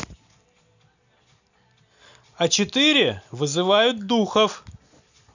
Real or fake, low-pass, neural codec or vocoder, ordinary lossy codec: real; 7.2 kHz; none; none